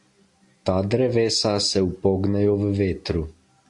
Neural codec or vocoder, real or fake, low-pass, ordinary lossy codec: none; real; 10.8 kHz; AAC, 64 kbps